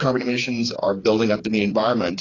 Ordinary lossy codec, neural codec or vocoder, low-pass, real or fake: AAC, 32 kbps; codec, 16 kHz, 4 kbps, FreqCodec, smaller model; 7.2 kHz; fake